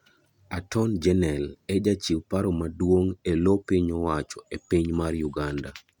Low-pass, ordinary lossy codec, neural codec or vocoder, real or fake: 19.8 kHz; none; none; real